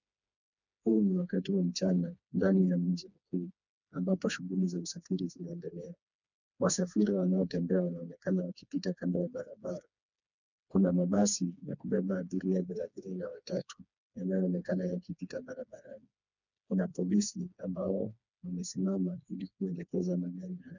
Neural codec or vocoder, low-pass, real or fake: codec, 16 kHz, 2 kbps, FreqCodec, smaller model; 7.2 kHz; fake